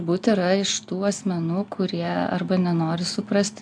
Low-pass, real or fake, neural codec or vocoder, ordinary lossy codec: 9.9 kHz; real; none; AAC, 64 kbps